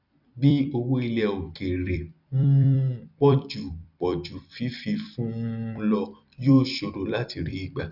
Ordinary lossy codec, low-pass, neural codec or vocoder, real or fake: none; 5.4 kHz; vocoder, 44.1 kHz, 128 mel bands every 256 samples, BigVGAN v2; fake